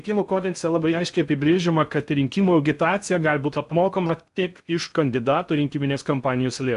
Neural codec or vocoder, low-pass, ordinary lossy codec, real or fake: codec, 16 kHz in and 24 kHz out, 0.6 kbps, FocalCodec, streaming, 2048 codes; 10.8 kHz; AAC, 96 kbps; fake